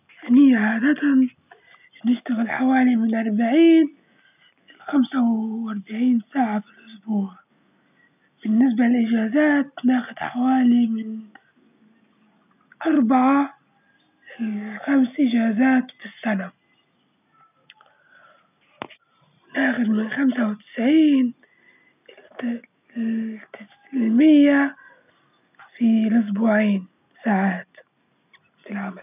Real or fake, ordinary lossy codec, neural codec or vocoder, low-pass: real; none; none; 3.6 kHz